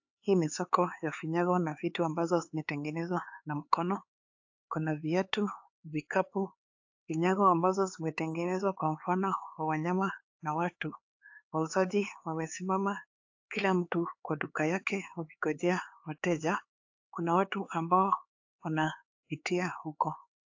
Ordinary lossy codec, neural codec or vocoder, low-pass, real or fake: AAC, 48 kbps; codec, 16 kHz, 4 kbps, X-Codec, HuBERT features, trained on LibriSpeech; 7.2 kHz; fake